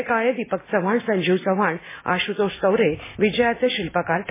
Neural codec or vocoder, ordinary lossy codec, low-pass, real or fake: none; MP3, 16 kbps; 3.6 kHz; real